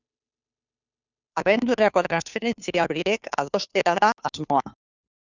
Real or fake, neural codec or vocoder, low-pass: fake; codec, 16 kHz, 2 kbps, FunCodec, trained on Chinese and English, 25 frames a second; 7.2 kHz